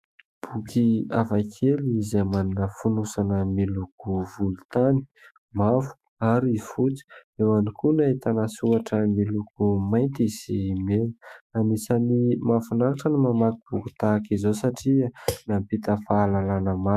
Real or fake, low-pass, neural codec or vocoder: fake; 14.4 kHz; autoencoder, 48 kHz, 128 numbers a frame, DAC-VAE, trained on Japanese speech